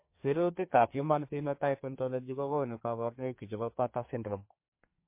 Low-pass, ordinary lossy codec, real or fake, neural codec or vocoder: 3.6 kHz; MP3, 24 kbps; fake; codec, 32 kHz, 1.9 kbps, SNAC